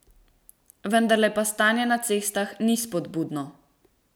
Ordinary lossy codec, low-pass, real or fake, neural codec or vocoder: none; none; real; none